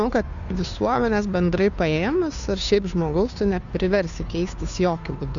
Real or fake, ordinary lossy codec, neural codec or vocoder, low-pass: fake; MP3, 96 kbps; codec, 16 kHz, 2 kbps, FunCodec, trained on Chinese and English, 25 frames a second; 7.2 kHz